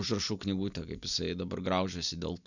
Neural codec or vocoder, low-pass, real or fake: none; 7.2 kHz; real